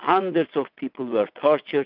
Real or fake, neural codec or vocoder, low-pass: fake; vocoder, 22.05 kHz, 80 mel bands, WaveNeXt; 5.4 kHz